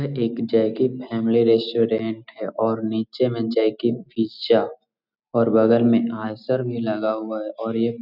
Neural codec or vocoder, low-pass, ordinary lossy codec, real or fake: none; 5.4 kHz; none; real